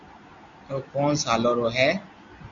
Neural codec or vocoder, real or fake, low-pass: none; real; 7.2 kHz